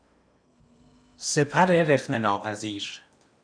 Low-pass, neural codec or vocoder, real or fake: 9.9 kHz; codec, 16 kHz in and 24 kHz out, 0.8 kbps, FocalCodec, streaming, 65536 codes; fake